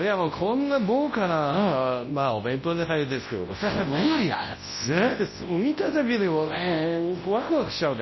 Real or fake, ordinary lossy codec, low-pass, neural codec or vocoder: fake; MP3, 24 kbps; 7.2 kHz; codec, 24 kHz, 0.9 kbps, WavTokenizer, large speech release